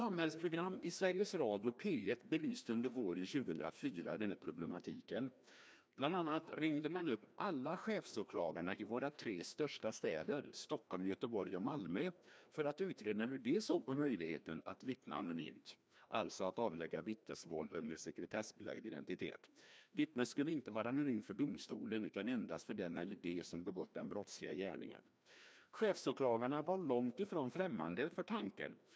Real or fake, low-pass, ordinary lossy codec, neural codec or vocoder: fake; none; none; codec, 16 kHz, 1 kbps, FreqCodec, larger model